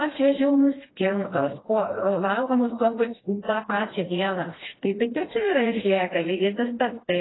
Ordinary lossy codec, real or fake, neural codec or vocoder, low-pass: AAC, 16 kbps; fake; codec, 16 kHz, 1 kbps, FreqCodec, smaller model; 7.2 kHz